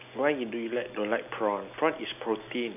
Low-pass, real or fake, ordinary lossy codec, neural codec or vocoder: 3.6 kHz; real; none; none